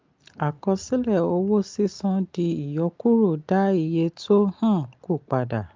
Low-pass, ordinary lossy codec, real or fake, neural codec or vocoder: 7.2 kHz; Opus, 24 kbps; real; none